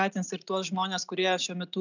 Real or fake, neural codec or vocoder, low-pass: real; none; 7.2 kHz